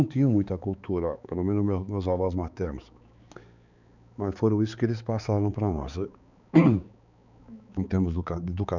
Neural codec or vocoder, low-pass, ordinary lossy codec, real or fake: codec, 16 kHz, 4 kbps, X-Codec, HuBERT features, trained on balanced general audio; 7.2 kHz; none; fake